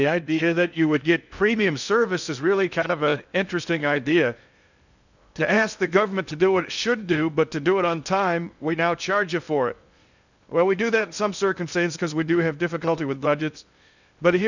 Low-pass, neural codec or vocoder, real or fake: 7.2 kHz; codec, 16 kHz in and 24 kHz out, 0.6 kbps, FocalCodec, streaming, 2048 codes; fake